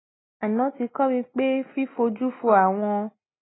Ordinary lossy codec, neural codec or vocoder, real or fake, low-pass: AAC, 16 kbps; none; real; 7.2 kHz